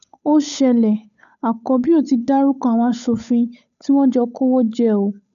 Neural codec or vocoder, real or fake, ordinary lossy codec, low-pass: codec, 16 kHz, 8 kbps, FunCodec, trained on Chinese and English, 25 frames a second; fake; none; 7.2 kHz